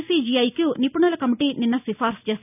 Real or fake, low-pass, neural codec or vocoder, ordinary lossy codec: real; 3.6 kHz; none; none